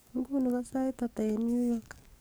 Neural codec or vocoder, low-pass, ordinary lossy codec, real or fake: codec, 44.1 kHz, 7.8 kbps, DAC; none; none; fake